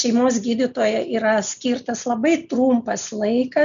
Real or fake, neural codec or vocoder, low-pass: real; none; 7.2 kHz